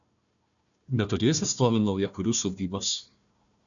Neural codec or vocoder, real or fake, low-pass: codec, 16 kHz, 1 kbps, FunCodec, trained on Chinese and English, 50 frames a second; fake; 7.2 kHz